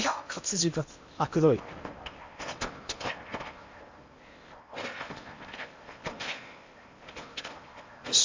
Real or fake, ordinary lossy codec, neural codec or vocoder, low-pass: fake; MP3, 64 kbps; codec, 16 kHz in and 24 kHz out, 0.8 kbps, FocalCodec, streaming, 65536 codes; 7.2 kHz